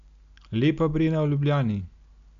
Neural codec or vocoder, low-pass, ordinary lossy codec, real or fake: none; 7.2 kHz; none; real